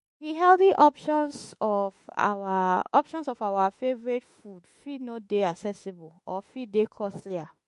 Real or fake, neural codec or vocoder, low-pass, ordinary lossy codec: fake; autoencoder, 48 kHz, 32 numbers a frame, DAC-VAE, trained on Japanese speech; 14.4 kHz; MP3, 48 kbps